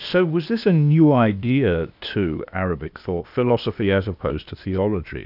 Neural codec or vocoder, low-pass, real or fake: codec, 16 kHz, 0.8 kbps, ZipCodec; 5.4 kHz; fake